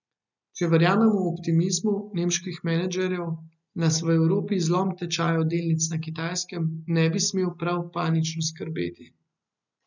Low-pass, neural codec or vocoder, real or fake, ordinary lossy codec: 7.2 kHz; none; real; none